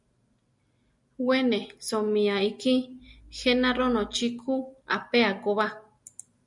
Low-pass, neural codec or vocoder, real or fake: 10.8 kHz; none; real